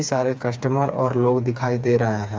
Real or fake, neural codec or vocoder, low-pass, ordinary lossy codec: fake; codec, 16 kHz, 4 kbps, FreqCodec, smaller model; none; none